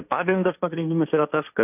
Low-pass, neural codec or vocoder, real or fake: 3.6 kHz; codec, 16 kHz, 1.1 kbps, Voila-Tokenizer; fake